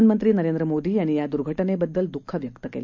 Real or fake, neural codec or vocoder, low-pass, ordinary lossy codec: real; none; 7.2 kHz; none